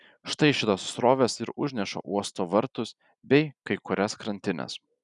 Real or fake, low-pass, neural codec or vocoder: real; 10.8 kHz; none